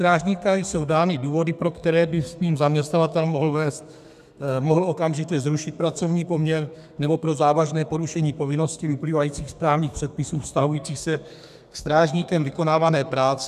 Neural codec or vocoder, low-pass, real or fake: codec, 32 kHz, 1.9 kbps, SNAC; 14.4 kHz; fake